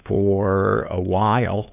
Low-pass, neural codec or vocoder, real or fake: 3.6 kHz; codec, 16 kHz, 2 kbps, FunCodec, trained on LibriTTS, 25 frames a second; fake